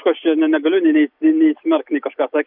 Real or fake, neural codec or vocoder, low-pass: real; none; 5.4 kHz